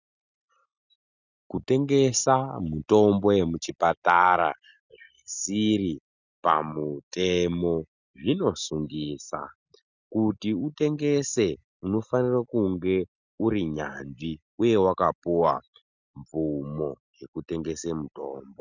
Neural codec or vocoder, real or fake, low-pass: none; real; 7.2 kHz